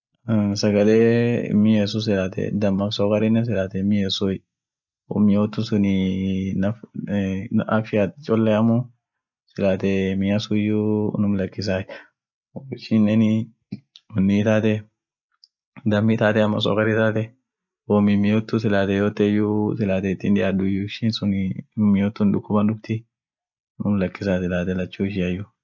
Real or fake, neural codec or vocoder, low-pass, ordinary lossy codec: real; none; 7.2 kHz; none